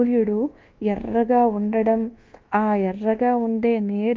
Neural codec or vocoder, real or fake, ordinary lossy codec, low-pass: codec, 24 kHz, 1.2 kbps, DualCodec; fake; Opus, 32 kbps; 7.2 kHz